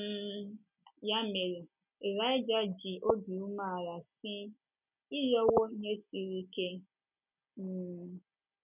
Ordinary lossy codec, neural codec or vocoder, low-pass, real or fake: none; none; 3.6 kHz; real